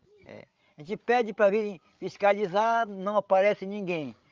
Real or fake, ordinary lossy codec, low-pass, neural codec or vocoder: fake; Opus, 32 kbps; 7.2 kHz; codec, 16 kHz, 16 kbps, FreqCodec, larger model